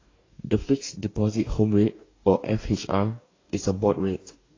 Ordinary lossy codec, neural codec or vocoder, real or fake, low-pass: AAC, 32 kbps; codec, 44.1 kHz, 2.6 kbps, DAC; fake; 7.2 kHz